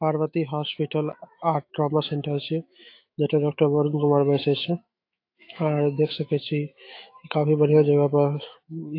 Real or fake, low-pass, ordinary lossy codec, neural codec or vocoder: fake; 5.4 kHz; AAC, 32 kbps; autoencoder, 48 kHz, 128 numbers a frame, DAC-VAE, trained on Japanese speech